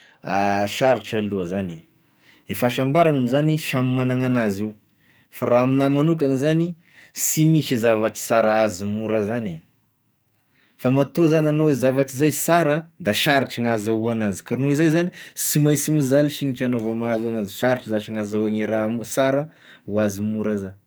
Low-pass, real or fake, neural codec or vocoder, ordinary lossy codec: none; fake; codec, 44.1 kHz, 2.6 kbps, SNAC; none